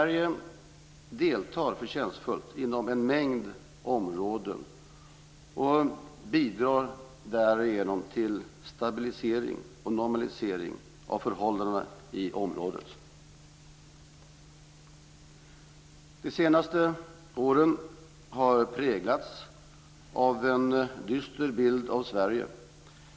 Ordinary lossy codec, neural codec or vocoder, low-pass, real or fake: none; none; none; real